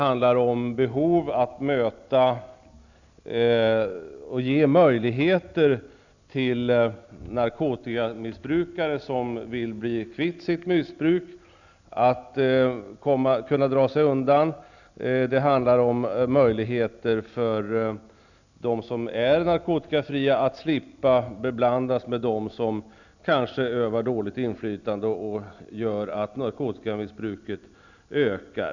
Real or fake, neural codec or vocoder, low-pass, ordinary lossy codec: real; none; 7.2 kHz; none